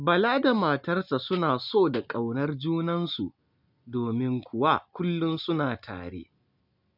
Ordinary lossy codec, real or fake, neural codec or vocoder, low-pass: none; real; none; 5.4 kHz